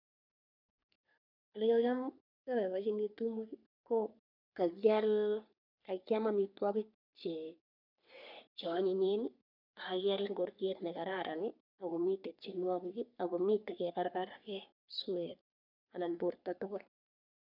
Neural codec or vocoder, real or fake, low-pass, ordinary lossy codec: codec, 44.1 kHz, 3.4 kbps, Pupu-Codec; fake; 5.4 kHz; none